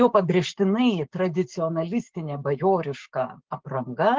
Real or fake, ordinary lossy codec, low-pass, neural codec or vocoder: fake; Opus, 16 kbps; 7.2 kHz; codec, 16 kHz, 6 kbps, DAC